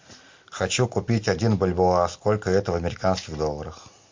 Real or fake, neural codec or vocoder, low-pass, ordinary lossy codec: real; none; 7.2 kHz; MP3, 48 kbps